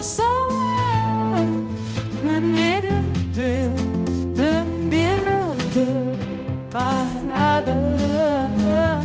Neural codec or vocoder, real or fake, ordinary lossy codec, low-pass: codec, 16 kHz, 0.5 kbps, X-Codec, HuBERT features, trained on balanced general audio; fake; none; none